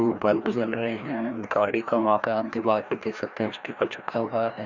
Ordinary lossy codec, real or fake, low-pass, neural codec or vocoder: Opus, 64 kbps; fake; 7.2 kHz; codec, 16 kHz, 1 kbps, FreqCodec, larger model